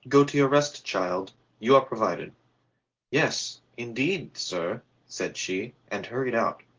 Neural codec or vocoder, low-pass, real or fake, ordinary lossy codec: none; 7.2 kHz; real; Opus, 16 kbps